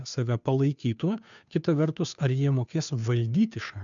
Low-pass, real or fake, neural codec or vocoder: 7.2 kHz; fake; codec, 16 kHz, 2 kbps, FunCodec, trained on Chinese and English, 25 frames a second